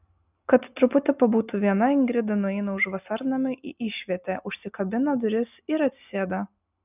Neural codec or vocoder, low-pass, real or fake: none; 3.6 kHz; real